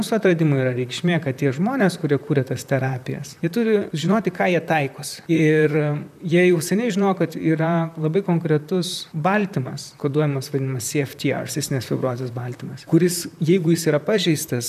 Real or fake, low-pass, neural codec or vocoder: fake; 14.4 kHz; vocoder, 44.1 kHz, 128 mel bands, Pupu-Vocoder